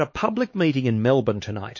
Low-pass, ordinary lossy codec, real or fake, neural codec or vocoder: 7.2 kHz; MP3, 32 kbps; fake; codec, 16 kHz, 4 kbps, X-Codec, HuBERT features, trained on LibriSpeech